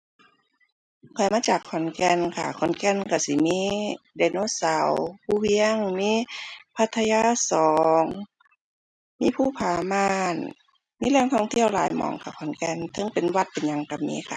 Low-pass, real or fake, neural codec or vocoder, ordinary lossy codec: none; real; none; none